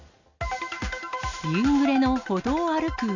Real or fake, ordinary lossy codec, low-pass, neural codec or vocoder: real; none; 7.2 kHz; none